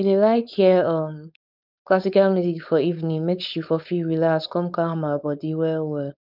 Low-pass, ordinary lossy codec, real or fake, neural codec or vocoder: 5.4 kHz; none; fake; codec, 16 kHz, 4.8 kbps, FACodec